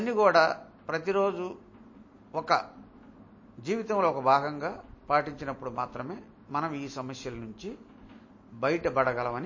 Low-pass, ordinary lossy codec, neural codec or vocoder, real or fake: 7.2 kHz; MP3, 32 kbps; none; real